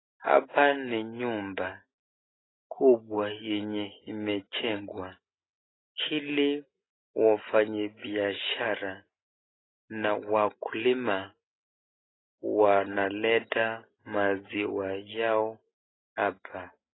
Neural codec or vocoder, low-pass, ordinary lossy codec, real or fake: none; 7.2 kHz; AAC, 16 kbps; real